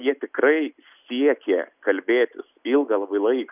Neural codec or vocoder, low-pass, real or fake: none; 3.6 kHz; real